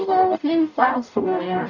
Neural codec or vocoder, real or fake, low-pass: codec, 44.1 kHz, 0.9 kbps, DAC; fake; 7.2 kHz